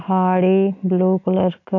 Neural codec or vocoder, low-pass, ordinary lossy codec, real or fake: none; 7.2 kHz; MP3, 48 kbps; real